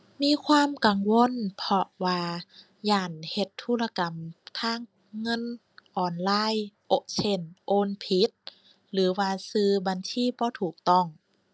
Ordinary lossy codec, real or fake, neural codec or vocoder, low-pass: none; real; none; none